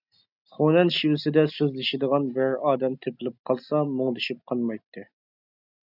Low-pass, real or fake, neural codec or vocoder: 5.4 kHz; real; none